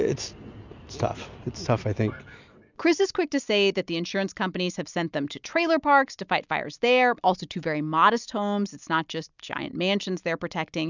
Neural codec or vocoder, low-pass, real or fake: none; 7.2 kHz; real